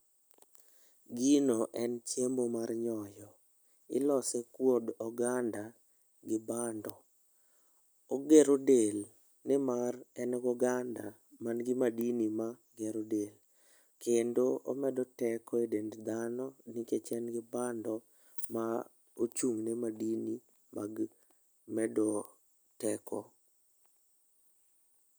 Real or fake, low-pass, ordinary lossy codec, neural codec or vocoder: real; none; none; none